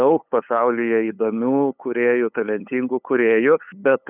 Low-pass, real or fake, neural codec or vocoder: 3.6 kHz; fake; codec, 16 kHz, 8 kbps, FunCodec, trained on LibriTTS, 25 frames a second